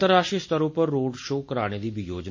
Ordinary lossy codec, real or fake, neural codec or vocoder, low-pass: MP3, 32 kbps; real; none; 7.2 kHz